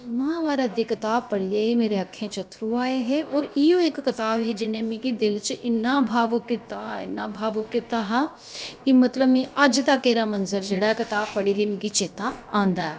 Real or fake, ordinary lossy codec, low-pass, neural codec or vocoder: fake; none; none; codec, 16 kHz, about 1 kbps, DyCAST, with the encoder's durations